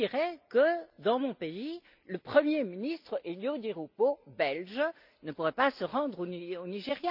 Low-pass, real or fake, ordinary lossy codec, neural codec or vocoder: 5.4 kHz; real; none; none